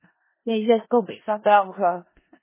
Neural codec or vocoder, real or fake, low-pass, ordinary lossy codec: codec, 16 kHz in and 24 kHz out, 0.4 kbps, LongCat-Audio-Codec, four codebook decoder; fake; 3.6 kHz; MP3, 16 kbps